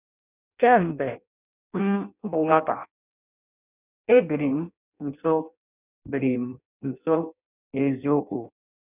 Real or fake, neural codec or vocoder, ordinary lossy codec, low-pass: fake; codec, 16 kHz in and 24 kHz out, 0.6 kbps, FireRedTTS-2 codec; none; 3.6 kHz